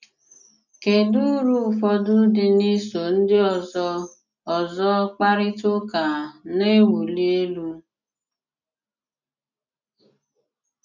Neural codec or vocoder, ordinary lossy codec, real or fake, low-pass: none; none; real; 7.2 kHz